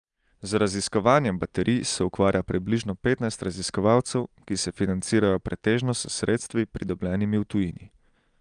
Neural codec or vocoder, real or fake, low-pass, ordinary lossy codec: none; real; 9.9 kHz; Opus, 32 kbps